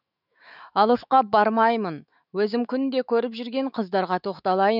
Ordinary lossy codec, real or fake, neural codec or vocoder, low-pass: none; fake; autoencoder, 48 kHz, 128 numbers a frame, DAC-VAE, trained on Japanese speech; 5.4 kHz